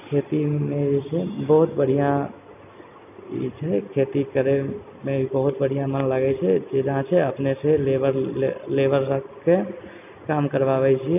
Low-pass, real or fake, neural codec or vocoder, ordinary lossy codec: 3.6 kHz; real; none; none